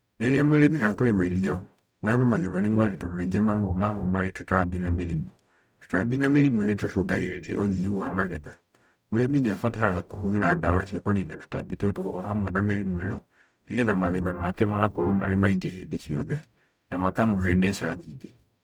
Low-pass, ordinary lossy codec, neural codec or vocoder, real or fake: none; none; codec, 44.1 kHz, 0.9 kbps, DAC; fake